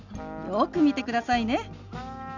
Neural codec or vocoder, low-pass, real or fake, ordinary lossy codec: none; 7.2 kHz; real; none